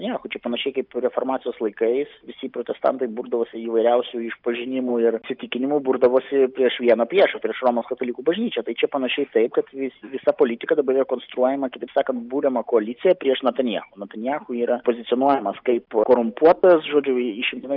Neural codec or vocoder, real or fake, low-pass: none; real; 5.4 kHz